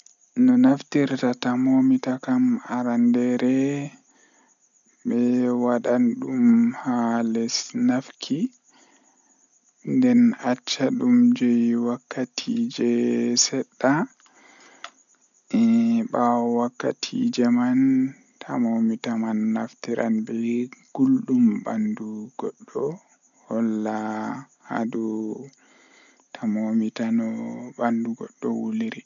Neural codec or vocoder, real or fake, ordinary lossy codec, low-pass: none; real; none; 7.2 kHz